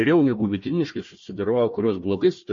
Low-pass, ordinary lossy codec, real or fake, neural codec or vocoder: 7.2 kHz; MP3, 32 kbps; fake; codec, 16 kHz, 1 kbps, FunCodec, trained on Chinese and English, 50 frames a second